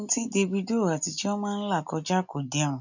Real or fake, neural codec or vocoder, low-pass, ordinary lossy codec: real; none; 7.2 kHz; none